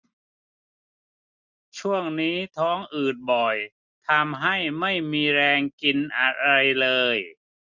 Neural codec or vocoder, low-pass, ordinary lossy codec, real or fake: none; 7.2 kHz; none; real